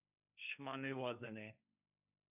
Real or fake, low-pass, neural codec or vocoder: fake; 3.6 kHz; codec, 16 kHz, 1.1 kbps, Voila-Tokenizer